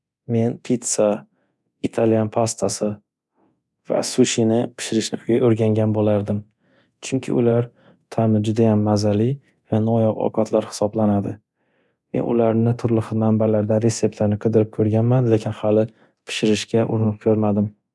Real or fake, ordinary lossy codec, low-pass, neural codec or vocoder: fake; none; none; codec, 24 kHz, 0.9 kbps, DualCodec